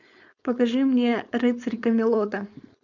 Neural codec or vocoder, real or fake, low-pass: codec, 16 kHz, 4.8 kbps, FACodec; fake; 7.2 kHz